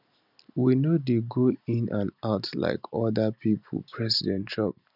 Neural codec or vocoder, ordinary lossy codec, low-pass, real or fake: none; none; 5.4 kHz; real